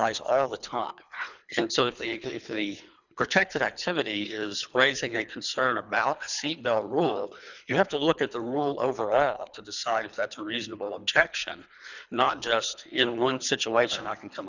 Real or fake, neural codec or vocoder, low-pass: fake; codec, 24 kHz, 3 kbps, HILCodec; 7.2 kHz